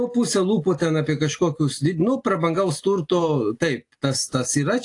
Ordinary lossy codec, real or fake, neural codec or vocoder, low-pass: AAC, 48 kbps; real; none; 10.8 kHz